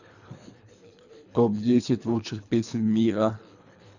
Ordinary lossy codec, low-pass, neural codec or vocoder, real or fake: none; 7.2 kHz; codec, 24 kHz, 3 kbps, HILCodec; fake